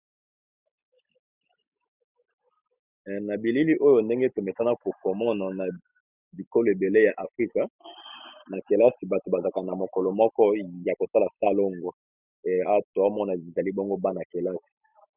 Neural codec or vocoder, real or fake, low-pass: none; real; 3.6 kHz